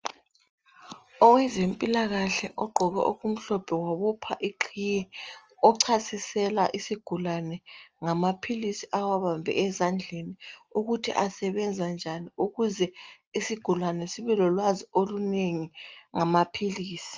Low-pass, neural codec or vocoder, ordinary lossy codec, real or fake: 7.2 kHz; none; Opus, 24 kbps; real